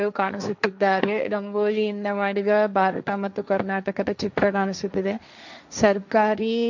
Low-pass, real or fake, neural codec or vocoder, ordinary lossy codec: 7.2 kHz; fake; codec, 16 kHz, 1.1 kbps, Voila-Tokenizer; none